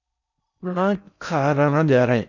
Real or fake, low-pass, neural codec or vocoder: fake; 7.2 kHz; codec, 16 kHz in and 24 kHz out, 0.6 kbps, FocalCodec, streaming, 4096 codes